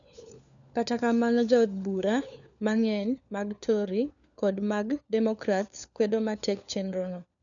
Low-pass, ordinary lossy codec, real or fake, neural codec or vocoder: 7.2 kHz; none; fake; codec, 16 kHz, 4 kbps, FunCodec, trained on LibriTTS, 50 frames a second